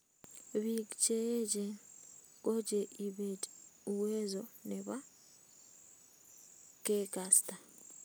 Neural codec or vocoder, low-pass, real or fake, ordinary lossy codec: none; none; real; none